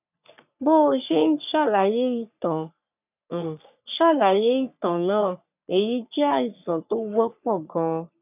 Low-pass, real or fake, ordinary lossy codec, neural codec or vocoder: 3.6 kHz; fake; none; codec, 44.1 kHz, 3.4 kbps, Pupu-Codec